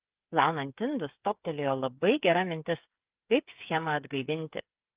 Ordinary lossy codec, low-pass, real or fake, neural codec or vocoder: Opus, 24 kbps; 3.6 kHz; fake; codec, 16 kHz, 8 kbps, FreqCodec, smaller model